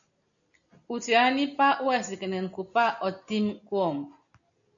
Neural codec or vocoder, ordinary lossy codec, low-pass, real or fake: none; MP3, 96 kbps; 7.2 kHz; real